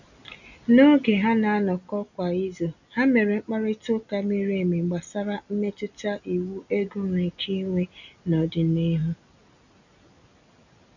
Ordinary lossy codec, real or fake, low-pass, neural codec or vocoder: none; real; 7.2 kHz; none